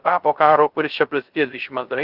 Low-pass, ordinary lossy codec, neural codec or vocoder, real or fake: 5.4 kHz; Opus, 32 kbps; codec, 16 kHz, 0.3 kbps, FocalCodec; fake